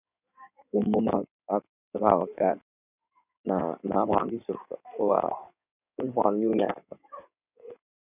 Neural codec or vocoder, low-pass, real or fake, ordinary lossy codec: codec, 16 kHz in and 24 kHz out, 2.2 kbps, FireRedTTS-2 codec; 3.6 kHz; fake; none